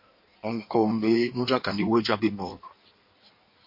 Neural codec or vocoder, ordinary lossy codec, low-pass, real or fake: codec, 16 kHz in and 24 kHz out, 1.1 kbps, FireRedTTS-2 codec; MP3, 32 kbps; 5.4 kHz; fake